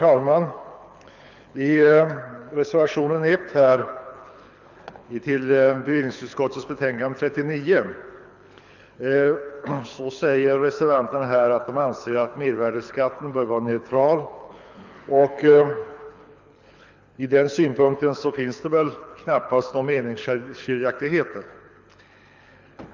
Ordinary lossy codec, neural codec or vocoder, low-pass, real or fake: none; codec, 24 kHz, 6 kbps, HILCodec; 7.2 kHz; fake